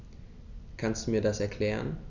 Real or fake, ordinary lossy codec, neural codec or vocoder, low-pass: real; none; none; 7.2 kHz